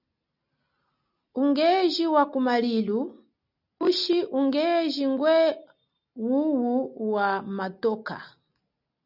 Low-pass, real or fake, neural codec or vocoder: 5.4 kHz; real; none